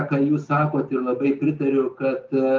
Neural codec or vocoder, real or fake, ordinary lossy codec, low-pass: none; real; Opus, 32 kbps; 7.2 kHz